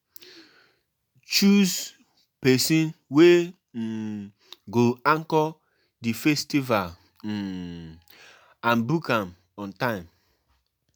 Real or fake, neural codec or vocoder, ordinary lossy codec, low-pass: real; none; none; none